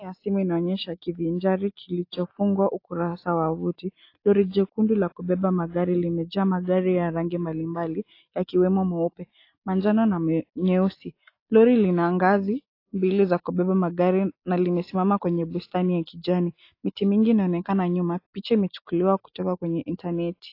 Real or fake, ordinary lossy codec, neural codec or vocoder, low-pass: real; AAC, 32 kbps; none; 5.4 kHz